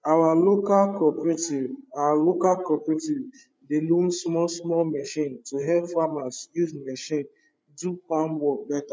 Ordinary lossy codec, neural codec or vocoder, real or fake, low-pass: none; codec, 16 kHz, 8 kbps, FreqCodec, larger model; fake; none